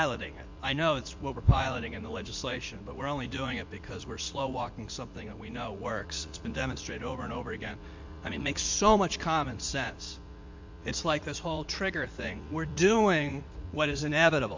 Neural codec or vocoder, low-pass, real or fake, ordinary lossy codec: vocoder, 44.1 kHz, 80 mel bands, Vocos; 7.2 kHz; fake; MP3, 64 kbps